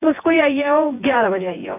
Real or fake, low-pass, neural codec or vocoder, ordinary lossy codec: fake; 3.6 kHz; vocoder, 24 kHz, 100 mel bands, Vocos; AAC, 24 kbps